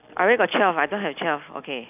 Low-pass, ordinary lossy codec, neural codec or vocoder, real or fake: 3.6 kHz; none; none; real